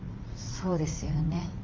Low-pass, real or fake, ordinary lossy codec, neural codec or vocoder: 7.2 kHz; fake; Opus, 24 kbps; vocoder, 44.1 kHz, 80 mel bands, Vocos